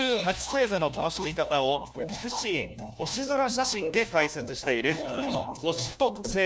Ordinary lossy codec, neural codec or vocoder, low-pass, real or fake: none; codec, 16 kHz, 1 kbps, FunCodec, trained on LibriTTS, 50 frames a second; none; fake